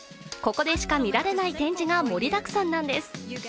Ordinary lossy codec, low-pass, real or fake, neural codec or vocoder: none; none; real; none